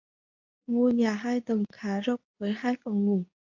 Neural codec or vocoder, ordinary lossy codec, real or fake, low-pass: codec, 24 kHz, 0.9 kbps, WavTokenizer, medium speech release version 2; AAC, 48 kbps; fake; 7.2 kHz